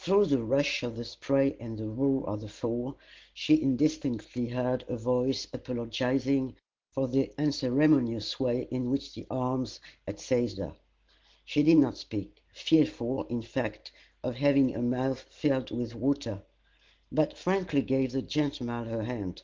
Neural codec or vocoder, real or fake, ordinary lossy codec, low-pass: none; real; Opus, 16 kbps; 7.2 kHz